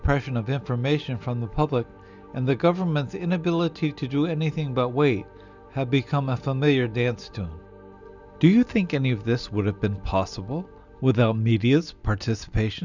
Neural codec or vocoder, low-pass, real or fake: none; 7.2 kHz; real